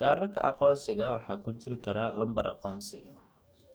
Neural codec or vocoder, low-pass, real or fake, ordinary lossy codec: codec, 44.1 kHz, 2.6 kbps, DAC; none; fake; none